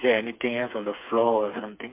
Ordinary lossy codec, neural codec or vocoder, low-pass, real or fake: MP3, 32 kbps; codec, 16 kHz, 4 kbps, FreqCodec, smaller model; 3.6 kHz; fake